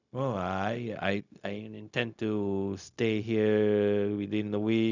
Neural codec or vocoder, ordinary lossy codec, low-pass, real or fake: codec, 16 kHz, 0.4 kbps, LongCat-Audio-Codec; none; 7.2 kHz; fake